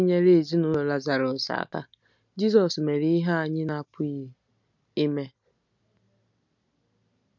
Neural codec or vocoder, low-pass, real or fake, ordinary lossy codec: none; 7.2 kHz; real; none